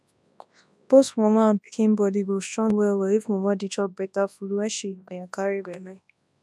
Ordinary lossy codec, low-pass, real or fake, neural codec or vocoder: none; none; fake; codec, 24 kHz, 0.9 kbps, WavTokenizer, large speech release